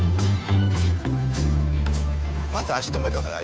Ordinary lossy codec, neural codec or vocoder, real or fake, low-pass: none; codec, 16 kHz, 2 kbps, FunCodec, trained on Chinese and English, 25 frames a second; fake; none